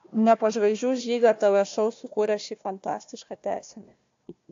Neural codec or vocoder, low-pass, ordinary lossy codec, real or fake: codec, 16 kHz, 1 kbps, FunCodec, trained on Chinese and English, 50 frames a second; 7.2 kHz; AAC, 48 kbps; fake